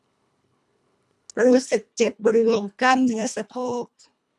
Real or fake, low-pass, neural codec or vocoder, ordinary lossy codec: fake; none; codec, 24 kHz, 1.5 kbps, HILCodec; none